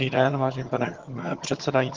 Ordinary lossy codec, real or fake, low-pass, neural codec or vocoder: Opus, 16 kbps; fake; 7.2 kHz; vocoder, 22.05 kHz, 80 mel bands, HiFi-GAN